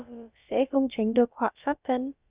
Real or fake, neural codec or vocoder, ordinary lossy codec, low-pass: fake; codec, 16 kHz, about 1 kbps, DyCAST, with the encoder's durations; Opus, 64 kbps; 3.6 kHz